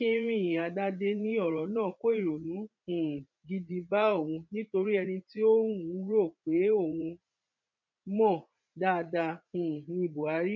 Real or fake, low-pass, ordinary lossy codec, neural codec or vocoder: fake; 7.2 kHz; none; vocoder, 44.1 kHz, 80 mel bands, Vocos